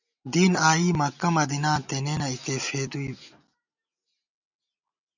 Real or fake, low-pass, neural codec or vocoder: real; 7.2 kHz; none